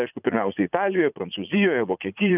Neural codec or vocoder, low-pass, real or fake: vocoder, 44.1 kHz, 80 mel bands, Vocos; 3.6 kHz; fake